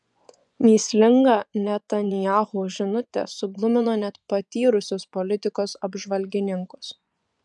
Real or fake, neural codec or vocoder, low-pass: fake; vocoder, 24 kHz, 100 mel bands, Vocos; 10.8 kHz